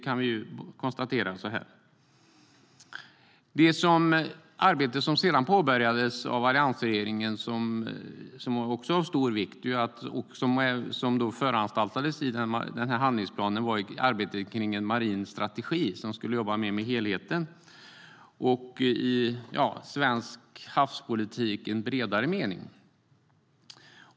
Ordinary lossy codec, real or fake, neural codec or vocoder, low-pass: none; real; none; none